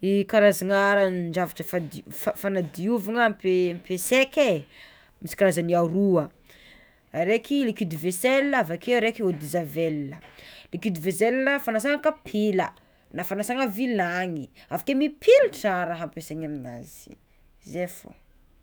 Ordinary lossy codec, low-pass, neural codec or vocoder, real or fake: none; none; autoencoder, 48 kHz, 128 numbers a frame, DAC-VAE, trained on Japanese speech; fake